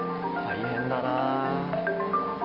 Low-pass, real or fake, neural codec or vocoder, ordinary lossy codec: 5.4 kHz; real; none; Opus, 24 kbps